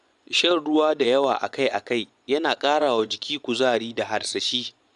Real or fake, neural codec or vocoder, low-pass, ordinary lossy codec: fake; vocoder, 24 kHz, 100 mel bands, Vocos; 10.8 kHz; MP3, 96 kbps